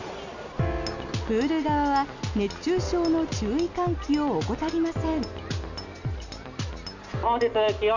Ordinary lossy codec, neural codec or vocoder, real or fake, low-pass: none; none; real; 7.2 kHz